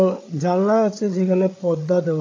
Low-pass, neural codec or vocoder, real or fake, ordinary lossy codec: 7.2 kHz; codec, 16 kHz, 8 kbps, FreqCodec, larger model; fake; AAC, 32 kbps